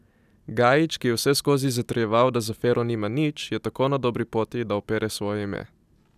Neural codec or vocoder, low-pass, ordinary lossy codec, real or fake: none; 14.4 kHz; none; real